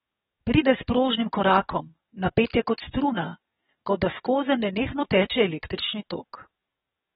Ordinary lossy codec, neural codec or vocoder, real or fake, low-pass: AAC, 16 kbps; codec, 44.1 kHz, 7.8 kbps, DAC; fake; 19.8 kHz